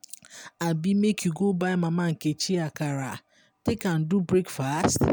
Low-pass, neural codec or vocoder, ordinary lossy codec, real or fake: none; none; none; real